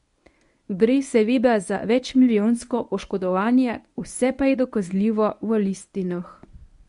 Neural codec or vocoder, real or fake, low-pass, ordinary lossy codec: codec, 24 kHz, 0.9 kbps, WavTokenizer, medium speech release version 1; fake; 10.8 kHz; MP3, 64 kbps